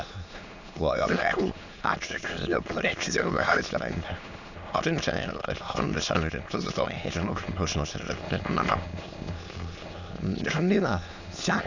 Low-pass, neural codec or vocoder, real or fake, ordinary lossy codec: 7.2 kHz; autoencoder, 22.05 kHz, a latent of 192 numbers a frame, VITS, trained on many speakers; fake; none